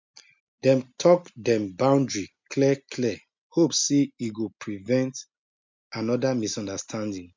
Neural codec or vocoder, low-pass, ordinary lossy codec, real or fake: none; 7.2 kHz; MP3, 64 kbps; real